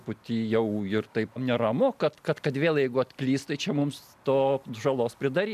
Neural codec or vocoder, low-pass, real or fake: none; 14.4 kHz; real